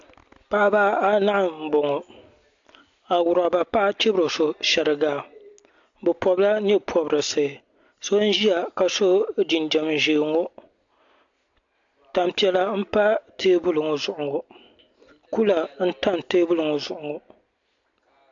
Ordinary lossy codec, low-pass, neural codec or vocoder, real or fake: AAC, 64 kbps; 7.2 kHz; none; real